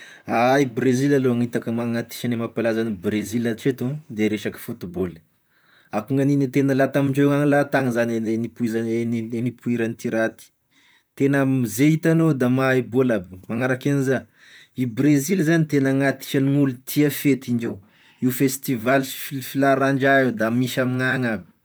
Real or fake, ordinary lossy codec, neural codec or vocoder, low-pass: fake; none; vocoder, 44.1 kHz, 128 mel bands, Pupu-Vocoder; none